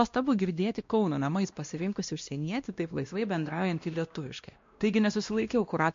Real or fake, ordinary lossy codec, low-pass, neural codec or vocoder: fake; MP3, 48 kbps; 7.2 kHz; codec, 16 kHz, 1 kbps, X-Codec, WavLM features, trained on Multilingual LibriSpeech